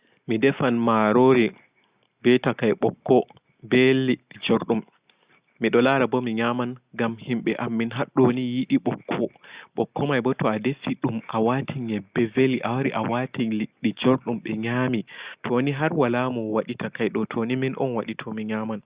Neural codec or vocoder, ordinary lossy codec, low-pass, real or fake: none; Opus, 64 kbps; 3.6 kHz; real